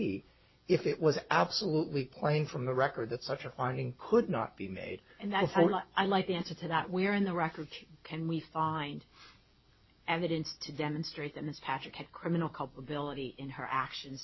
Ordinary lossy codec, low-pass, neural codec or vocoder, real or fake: MP3, 24 kbps; 7.2 kHz; none; real